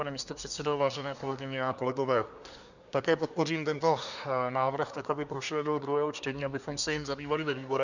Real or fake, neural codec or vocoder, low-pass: fake; codec, 24 kHz, 1 kbps, SNAC; 7.2 kHz